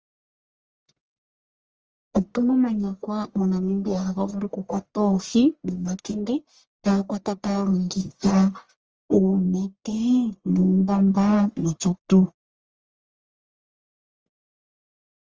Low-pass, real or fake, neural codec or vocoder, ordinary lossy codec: 7.2 kHz; fake; codec, 44.1 kHz, 1.7 kbps, Pupu-Codec; Opus, 24 kbps